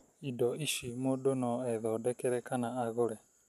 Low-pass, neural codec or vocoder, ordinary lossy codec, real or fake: 14.4 kHz; none; none; real